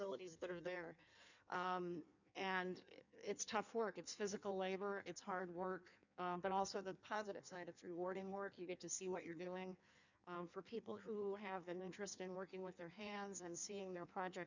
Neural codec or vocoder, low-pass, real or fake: codec, 16 kHz in and 24 kHz out, 1.1 kbps, FireRedTTS-2 codec; 7.2 kHz; fake